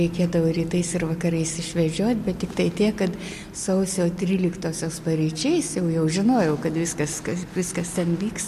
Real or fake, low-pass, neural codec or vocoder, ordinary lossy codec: real; 14.4 kHz; none; MP3, 64 kbps